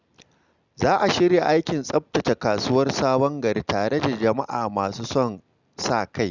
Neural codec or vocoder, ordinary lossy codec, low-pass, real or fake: none; Opus, 64 kbps; 7.2 kHz; real